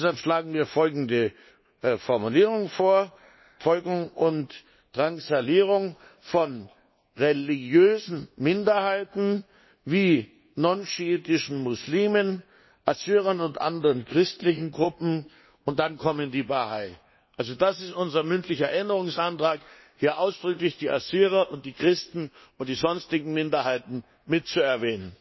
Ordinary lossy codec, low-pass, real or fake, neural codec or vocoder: MP3, 24 kbps; 7.2 kHz; fake; codec, 24 kHz, 1.2 kbps, DualCodec